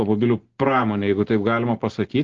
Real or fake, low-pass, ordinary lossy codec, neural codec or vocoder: real; 7.2 kHz; Opus, 16 kbps; none